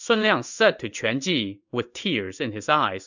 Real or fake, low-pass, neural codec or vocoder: fake; 7.2 kHz; codec, 16 kHz in and 24 kHz out, 1 kbps, XY-Tokenizer